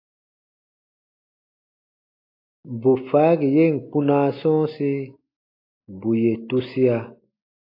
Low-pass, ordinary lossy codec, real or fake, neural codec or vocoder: 5.4 kHz; AAC, 32 kbps; real; none